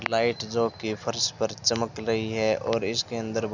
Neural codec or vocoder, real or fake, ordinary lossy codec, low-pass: none; real; none; 7.2 kHz